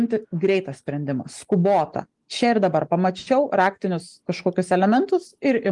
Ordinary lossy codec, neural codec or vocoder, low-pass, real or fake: Opus, 24 kbps; none; 10.8 kHz; real